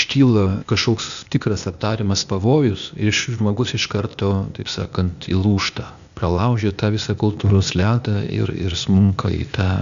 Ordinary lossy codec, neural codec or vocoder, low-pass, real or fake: MP3, 96 kbps; codec, 16 kHz, 0.8 kbps, ZipCodec; 7.2 kHz; fake